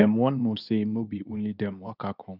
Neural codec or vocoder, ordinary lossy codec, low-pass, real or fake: codec, 24 kHz, 0.9 kbps, WavTokenizer, medium speech release version 2; none; 5.4 kHz; fake